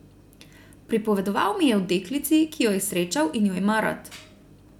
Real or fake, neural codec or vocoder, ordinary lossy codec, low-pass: real; none; none; 19.8 kHz